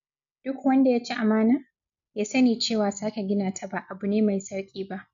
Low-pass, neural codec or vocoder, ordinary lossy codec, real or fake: 7.2 kHz; none; none; real